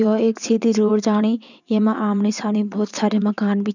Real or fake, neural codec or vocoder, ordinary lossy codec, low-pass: fake; vocoder, 22.05 kHz, 80 mel bands, WaveNeXt; none; 7.2 kHz